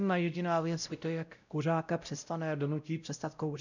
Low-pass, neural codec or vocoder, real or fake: 7.2 kHz; codec, 16 kHz, 0.5 kbps, X-Codec, WavLM features, trained on Multilingual LibriSpeech; fake